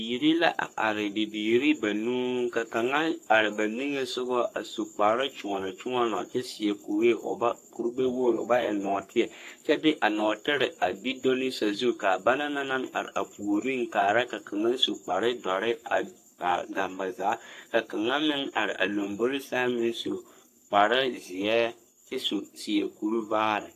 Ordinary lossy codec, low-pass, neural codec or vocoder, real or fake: AAC, 64 kbps; 14.4 kHz; codec, 44.1 kHz, 3.4 kbps, Pupu-Codec; fake